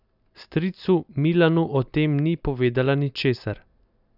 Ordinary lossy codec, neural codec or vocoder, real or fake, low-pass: none; none; real; 5.4 kHz